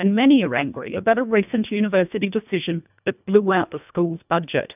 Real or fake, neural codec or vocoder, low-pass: fake; codec, 24 kHz, 1.5 kbps, HILCodec; 3.6 kHz